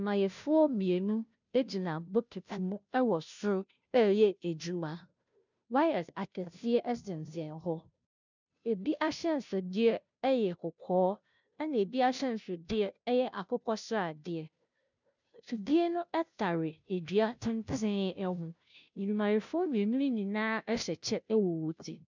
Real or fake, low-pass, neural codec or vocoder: fake; 7.2 kHz; codec, 16 kHz, 0.5 kbps, FunCodec, trained on Chinese and English, 25 frames a second